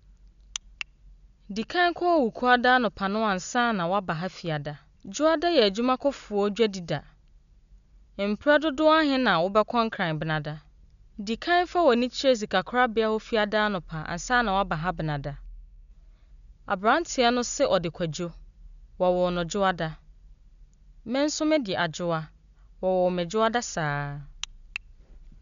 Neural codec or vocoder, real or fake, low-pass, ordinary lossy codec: none; real; 7.2 kHz; none